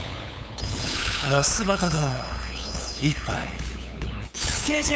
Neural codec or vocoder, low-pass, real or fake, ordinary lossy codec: codec, 16 kHz, 8 kbps, FunCodec, trained on LibriTTS, 25 frames a second; none; fake; none